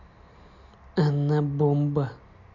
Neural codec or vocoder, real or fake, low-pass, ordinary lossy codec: none; real; 7.2 kHz; none